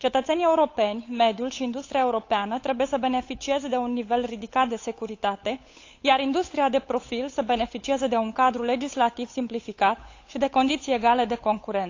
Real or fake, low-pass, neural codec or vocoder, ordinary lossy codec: fake; 7.2 kHz; codec, 16 kHz, 8 kbps, FunCodec, trained on Chinese and English, 25 frames a second; AAC, 48 kbps